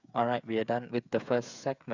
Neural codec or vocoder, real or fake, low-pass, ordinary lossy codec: codec, 16 kHz, 8 kbps, FreqCodec, smaller model; fake; 7.2 kHz; none